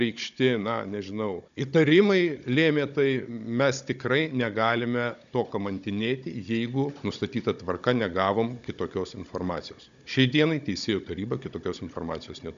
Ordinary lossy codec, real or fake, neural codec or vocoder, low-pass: AAC, 96 kbps; fake; codec, 16 kHz, 16 kbps, FunCodec, trained on Chinese and English, 50 frames a second; 7.2 kHz